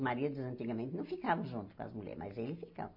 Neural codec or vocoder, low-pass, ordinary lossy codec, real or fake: none; 5.4 kHz; MP3, 32 kbps; real